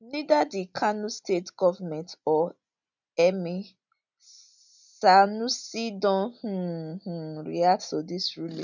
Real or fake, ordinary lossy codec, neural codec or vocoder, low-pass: real; none; none; 7.2 kHz